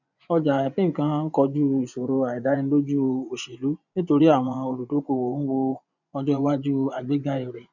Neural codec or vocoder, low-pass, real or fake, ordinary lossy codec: vocoder, 24 kHz, 100 mel bands, Vocos; 7.2 kHz; fake; none